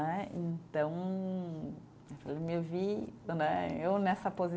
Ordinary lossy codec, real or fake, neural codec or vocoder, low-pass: none; real; none; none